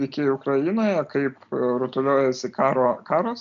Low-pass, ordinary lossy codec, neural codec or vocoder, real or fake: 7.2 kHz; MP3, 64 kbps; none; real